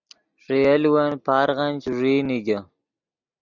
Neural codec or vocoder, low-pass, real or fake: none; 7.2 kHz; real